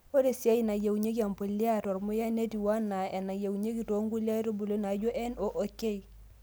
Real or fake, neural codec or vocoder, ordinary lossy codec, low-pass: real; none; none; none